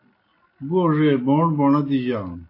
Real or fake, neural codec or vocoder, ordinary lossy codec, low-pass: real; none; AAC, 32 kbps; 5.4 kHz